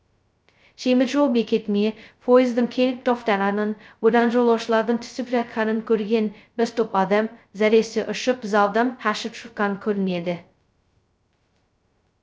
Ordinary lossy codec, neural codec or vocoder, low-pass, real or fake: none; codec, 16 kHz, 0.2 kbps, FocalCodec; none; fake